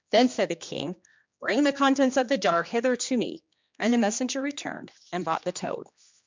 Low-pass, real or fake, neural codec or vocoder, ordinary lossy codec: 7.2 kHz; fake; codec, 16 kHz, 2 kbps, X-Codec, HuBERT features, trained on general audio; MP3, 64 kbps